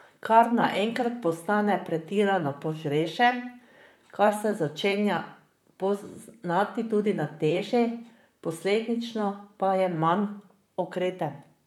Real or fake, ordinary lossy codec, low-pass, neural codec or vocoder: fake; none; 19.8 kHz; vocoder, 44.1 kHz, 128 mel bands, Pupu-Vocoder